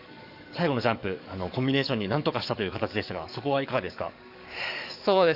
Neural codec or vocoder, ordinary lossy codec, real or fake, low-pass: codec, 44.1 kHz, 7.8 kbps, DAC; none; fake; 5.4 kHz